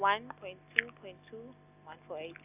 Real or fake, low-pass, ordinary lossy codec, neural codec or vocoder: real; 3.6 kHz; none; none